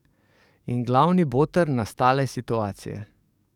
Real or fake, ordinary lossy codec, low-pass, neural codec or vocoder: fake; none; 19.8 kHz; codec, 44.1 kHz, 7.8 kbps, DAC